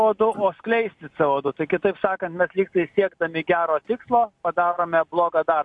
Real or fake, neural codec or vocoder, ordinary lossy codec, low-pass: real; none; MP3, 64 kbps; 10.8 kHz